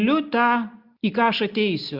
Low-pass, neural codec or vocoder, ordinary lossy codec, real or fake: 5.4 kHz; none; Opus, 64 kbps; real